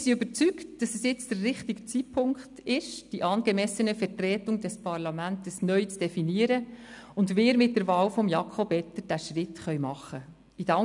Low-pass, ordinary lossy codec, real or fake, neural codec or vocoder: 10.8 kHz; none; real; none